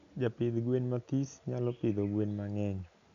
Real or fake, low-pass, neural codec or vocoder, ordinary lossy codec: real; 7.2 kHz; none; none